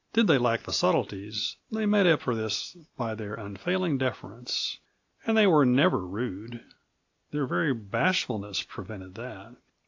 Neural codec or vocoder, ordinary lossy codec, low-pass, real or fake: none; AAC, 48 kbps; 7.2 kHz; real